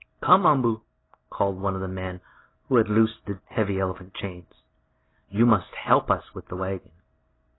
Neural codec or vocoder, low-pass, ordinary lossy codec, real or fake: none; 7.2 kHz; AAC, 16 kbps; real